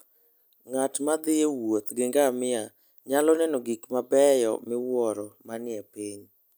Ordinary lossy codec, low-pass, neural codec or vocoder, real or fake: none; none; none; real